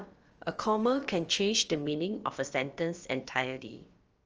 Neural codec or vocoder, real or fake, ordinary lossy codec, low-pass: codec, 16 kHz, about 1 kbps, DyCAST, with the encoder's durations; fake; Opus, 24 kbps; 7.2 kHz